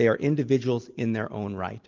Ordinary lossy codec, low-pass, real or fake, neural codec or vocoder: Opus, 24 kbps; 7.2 kHz; real; none